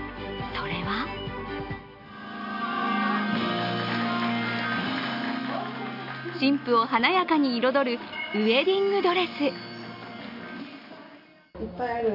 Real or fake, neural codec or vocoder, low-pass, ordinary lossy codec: real; none; 5.4 kHz; none